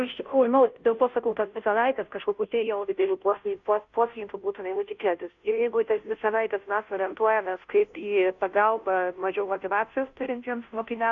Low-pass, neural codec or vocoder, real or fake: 7.2 kHz; codec, 16 kHz, 0.5 kbps, FunCodec, trained on Chinese and English, 25 frames a second; fake